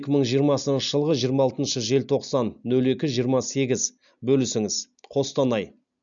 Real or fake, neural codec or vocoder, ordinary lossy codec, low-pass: real; none; none; 7.2 kHz